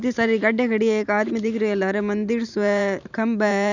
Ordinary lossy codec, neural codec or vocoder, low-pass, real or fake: none; vocoder, 44.1 kHz, 128 mel bands every 512 samples, BigVGAN v2; 7.2 kHz; fake